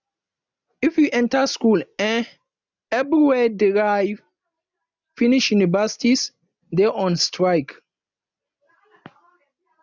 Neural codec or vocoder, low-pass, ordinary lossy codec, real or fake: none; 7.2 kHz; none; real